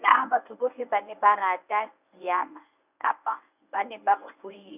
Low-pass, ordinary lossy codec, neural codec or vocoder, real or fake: 3.6 kHz; none; codec, 24 kHz, 0.9 kbps, WavTokenizer, medium speech release version 1; fake